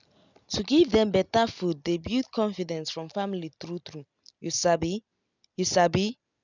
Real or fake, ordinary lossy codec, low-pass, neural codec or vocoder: real; none; 7.2 kHz; none